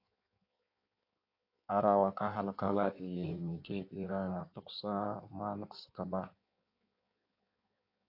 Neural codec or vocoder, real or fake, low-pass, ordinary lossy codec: codec, 16 kHz in and 24 kHz out, 1.1 kbps, FireRedTTS-2 codec; fake; 5.4 kHz; AAC, 32 kbps